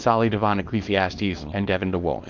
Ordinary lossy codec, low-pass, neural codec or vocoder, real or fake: Opus, 24 kbps; 7.2 kHz; codec, 24 kHz, 0.9 kbps, WavTokenizer, small release; fake